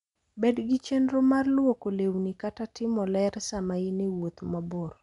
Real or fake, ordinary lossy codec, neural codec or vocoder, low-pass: real; none; none; 10.8 kHz